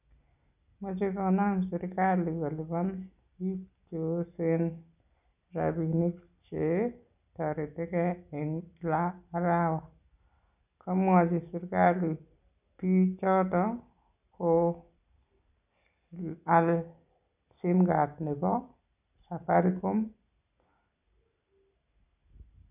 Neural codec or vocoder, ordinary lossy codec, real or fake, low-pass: none; none; real; 3.6 kHz